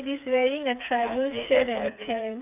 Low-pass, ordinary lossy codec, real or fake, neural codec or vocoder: 3.6 kHz; none; fake; codec, 16 kHz, 4 kbps, FreqCodec, smaller model